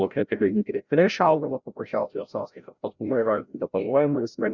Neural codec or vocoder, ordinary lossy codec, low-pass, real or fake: codec, 16 kHz, 0.5 kbps, FreqCodec, larger model; Opus, 64 kbps; 7.2 kHz; fake